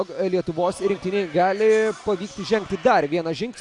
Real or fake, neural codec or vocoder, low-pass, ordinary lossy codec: real; none; 10.8 kHz; AAC, 64 kbps